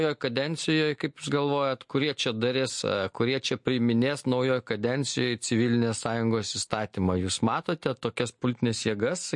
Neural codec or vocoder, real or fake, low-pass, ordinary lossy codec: none; real; 10.8 kHz; MP3, 48 kbps